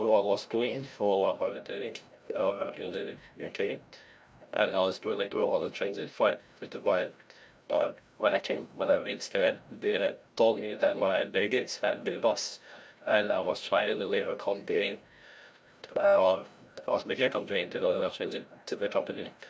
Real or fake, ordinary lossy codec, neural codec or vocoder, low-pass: fake; none; codec, 16 kHz, 0.5 kbps, FreqCodec, larger model; none